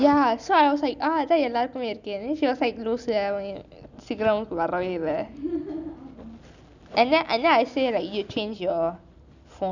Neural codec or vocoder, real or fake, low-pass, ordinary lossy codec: none; real; 7.2 kHz; none